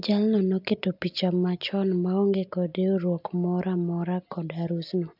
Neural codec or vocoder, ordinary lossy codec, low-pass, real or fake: none; AAC, 48 kbps; 5.4 kHz; real